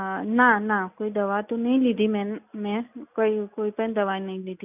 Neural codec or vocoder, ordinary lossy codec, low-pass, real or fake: none; none; 3.6 kHz; real